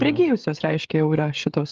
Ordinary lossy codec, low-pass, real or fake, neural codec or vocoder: Opus, 32 kbps; 7.2 kHz; fake; codec, 16 kHz, 16 kbps, FreqCodec, smaller model